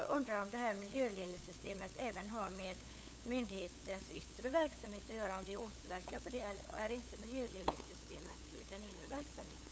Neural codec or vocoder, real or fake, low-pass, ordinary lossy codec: codec, 16 kHz, 8 kbps, FunCodec, trained on LibriTTS, 25 frames a second; fake; none; none